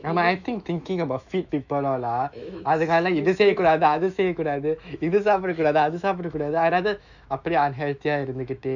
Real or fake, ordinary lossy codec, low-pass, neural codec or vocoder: fake; none; 7.2 kHz; autoencoder, 48 kHz, 128 numbers a frame, DAC-VAE, trained on Japanese speech